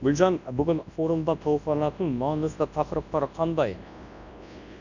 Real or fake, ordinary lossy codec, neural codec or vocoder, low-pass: fake; none; codec, 24 kHz, 0.9 kbps, WavTokenizer, large speech release; 7.2 kHz